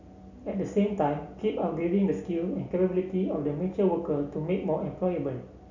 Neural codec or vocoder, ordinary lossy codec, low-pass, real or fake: none; none; 7.2 kHz; real